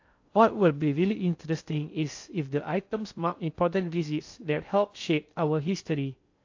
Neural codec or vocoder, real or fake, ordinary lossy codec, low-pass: codec, 16 kHz in and 24 kHz out, 0.6 kbps, FocalCodec, streaming, 2048 codes; fake; AAC, 48 kbps; 7.2 kHz